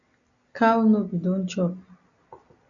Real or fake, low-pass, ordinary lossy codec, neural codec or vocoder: real; 7.2 kHz; MP3, 96 kbps; none